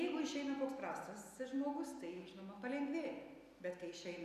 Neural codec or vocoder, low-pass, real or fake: none; 14.4 kHz; real